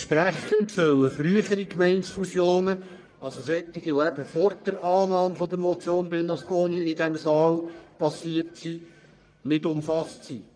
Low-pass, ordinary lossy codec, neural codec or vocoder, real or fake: 9.9 kHz; none; codec, 44.1 kHz, 1.7 kbps, Pupu-Codec; fake